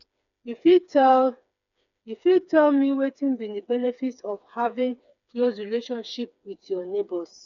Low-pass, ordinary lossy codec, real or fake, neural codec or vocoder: 7.2 kHz; none; fake; codec, 16 kHz, 4 kbps, FreqCodec, smaller model